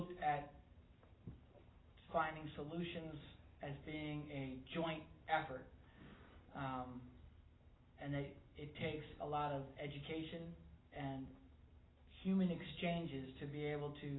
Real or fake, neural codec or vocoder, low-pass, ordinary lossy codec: real; none; 7.2 kHz; AAC, 16 kbps